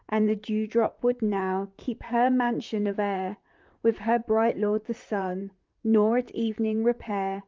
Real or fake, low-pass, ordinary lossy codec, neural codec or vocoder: fake; 7.2 kHz; Opus, 24 kbps; vocoder, 44.1 kHz, 128 mel bands, Pupu-Vocoder